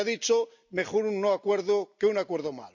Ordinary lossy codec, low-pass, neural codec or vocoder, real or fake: none; 7.2 kHz; none; real